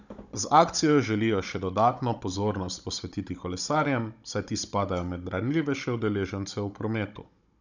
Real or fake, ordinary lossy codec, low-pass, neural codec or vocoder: fake; none; 7.2 kHz; codec, 16 kHz, 16 kbps, FunCodec, trained on Chinese and English, 50 frames a second